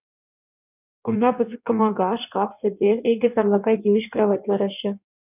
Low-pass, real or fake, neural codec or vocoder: 3.6 kHz; fake; codec, 16 kHz in and 24 kHz out, 1.1 kbps, FireRedTTS-2 codec